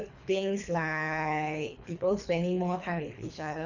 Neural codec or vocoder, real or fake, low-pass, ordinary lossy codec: codec, 24 kHz, 3 kbps, HILCodec; fake; 7.2 kHz; none